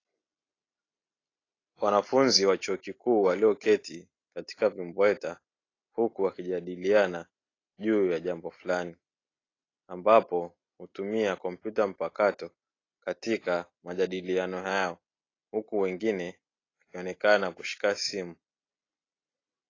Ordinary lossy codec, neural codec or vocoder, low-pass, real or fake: AAC, 32 kbps; none; 7.2 kHz; real